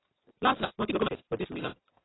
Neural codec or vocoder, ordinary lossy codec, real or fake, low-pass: none; AAC, 16 kbps; real; 7.2 kHz